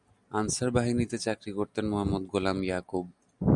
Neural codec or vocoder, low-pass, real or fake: none; 10.8 kHz; real